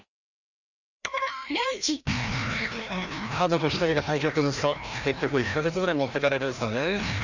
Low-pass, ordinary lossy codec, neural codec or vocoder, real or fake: 7.2 kHz; none; codec, 16 kHz, 1 kbps, FreqCodec, larger model; fake